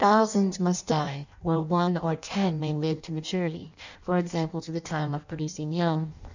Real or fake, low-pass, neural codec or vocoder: fake; 7.2 kHz; codec, 16 kHz in and 24 kHz out, 0.6 kbps, FireRedTTS-2 codec